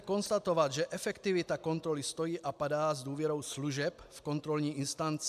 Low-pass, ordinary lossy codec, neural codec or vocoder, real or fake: 14.4 kHz; AAC, 96 kbps; none; real